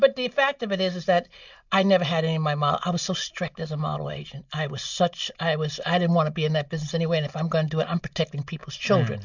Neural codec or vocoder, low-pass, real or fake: none; 7.2 kHz; real